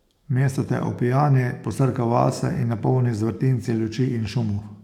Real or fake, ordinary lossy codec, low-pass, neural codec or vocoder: fake; none; 19.8 kHz; codec, 44.1 kHz, 7.8 kbps, DAC